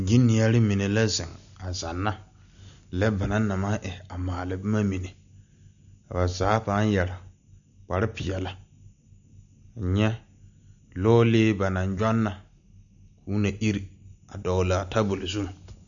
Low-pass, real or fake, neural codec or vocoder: 7.2 kHz; real; none